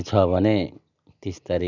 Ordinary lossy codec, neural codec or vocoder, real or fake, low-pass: none; none; real; 7.2 kHz